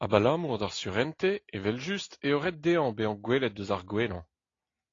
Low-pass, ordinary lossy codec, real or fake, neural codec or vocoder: 7.2 kHz; AAC, 32 kbps; real; none